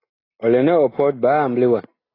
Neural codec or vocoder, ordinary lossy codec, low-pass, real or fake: none; AAC, 24 kbps; 5.4 kHz; real